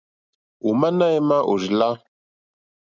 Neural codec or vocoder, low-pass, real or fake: none; 7.2 kHz; real